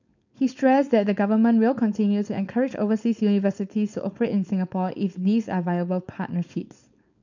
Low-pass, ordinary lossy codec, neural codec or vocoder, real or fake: 7.2 kHz; none; codec, 16 kHz, 4.8 kbps, FACodec; fake